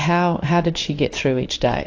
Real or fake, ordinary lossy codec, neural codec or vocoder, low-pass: fake; AAC, 48 kbps; codec, 16 kHz in and 24 kHz out, 1 kbps, XY-Tokenizer; 7.2 kHz